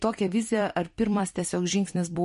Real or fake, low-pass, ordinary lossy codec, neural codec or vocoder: fake; 14.4 kHz; MP3, 48 kbps; vocoder, 44.1 kHz, 128 mel bands every 256 samples, BigVGAN v2